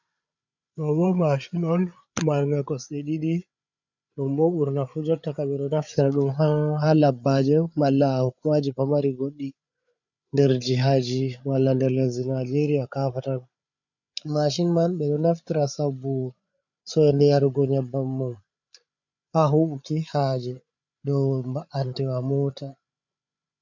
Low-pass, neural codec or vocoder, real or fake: 7.2 kHz; codec, 16 kHz, 8 kbps, FreqCodec, larger model; fake